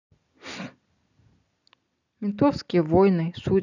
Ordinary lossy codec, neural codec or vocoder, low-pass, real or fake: none; none; 7.2 kHz; real